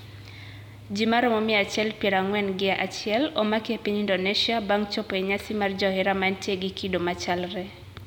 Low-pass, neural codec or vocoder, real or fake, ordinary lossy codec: 19.8 kHz; none; real; none